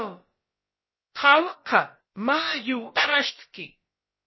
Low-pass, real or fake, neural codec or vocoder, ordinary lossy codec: 7.2 kHz; fake; codec, 16 kHz, about 1 kbps, DyCAST, with the encoder's durations; MP3, 24 kbps